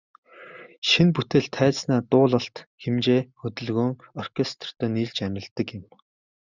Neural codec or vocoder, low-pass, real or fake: none; 7.2 kHz; real